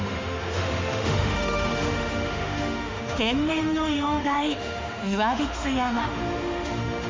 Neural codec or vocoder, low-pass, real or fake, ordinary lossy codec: autoencoder, 48 kHz, 32 numbers a frame, DAC-VAE, trained on Japanese speech; 7.2 kHz; fake; MP3, 64 kbps